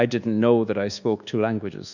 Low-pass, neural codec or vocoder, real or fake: 7.2 kHz; codec, 24 kHz, 1.2 kbps, DualCodec; fake